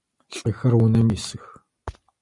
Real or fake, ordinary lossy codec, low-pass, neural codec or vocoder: real; Opus, 64 kbps; 10.8 kHz; none